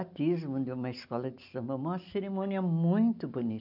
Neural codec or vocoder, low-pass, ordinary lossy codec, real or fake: none; 5.4 kHz; none; real